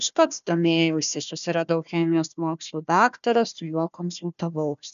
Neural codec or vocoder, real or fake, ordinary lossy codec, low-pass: codec, 16 kHz, 1 kbps, FunCodec, trained on Chinese and English, 50 frames a second; fake; MP3, 96 kbps; 7.2 kHz